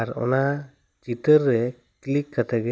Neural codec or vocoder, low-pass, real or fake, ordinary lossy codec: none; none; real; none